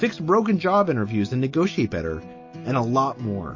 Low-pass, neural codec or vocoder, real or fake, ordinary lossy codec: 7.2 kHz; none; real; MP3, 32 kbps